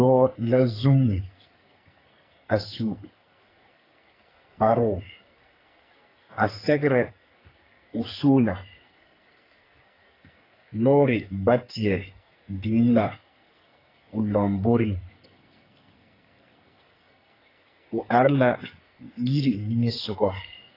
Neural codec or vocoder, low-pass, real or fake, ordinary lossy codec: codec, 44.1 kHz, 2.6 kbps, SNAC; 5.4 kHz; fake; AAC, 24 kbps